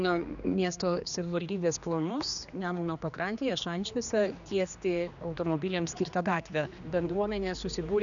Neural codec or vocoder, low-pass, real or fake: codec, 16 kHz, 2 kbps, X-Codec, HuBERT features, trained on general audio; 7.2 kHz; fake